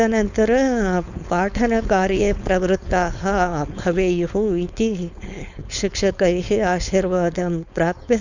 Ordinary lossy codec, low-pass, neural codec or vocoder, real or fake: none; 7.2 kHz; codec, 16 kHz, 4.8 kbps, FACodec; fake